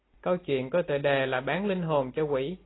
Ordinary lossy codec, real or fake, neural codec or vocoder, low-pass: AAC, 16 kbps; real; none; 7.2 kHz